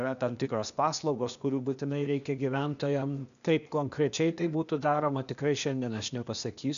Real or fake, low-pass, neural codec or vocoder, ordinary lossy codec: fake; 7.2 kHz; codec, 16 kHz, 0.8 kbps, ZipCodec; MP3, 96 kbps